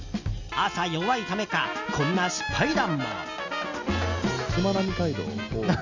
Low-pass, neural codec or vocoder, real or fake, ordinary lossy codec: 7.2 kHz; none; real; none